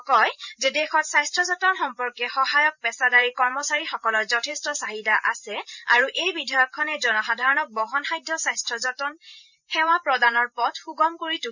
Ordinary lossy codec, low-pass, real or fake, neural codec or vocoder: none; 7.2 kHz; fake; vocoder, 44.1 kHz, 128 mel bands every 512 samples, BigVGAN v2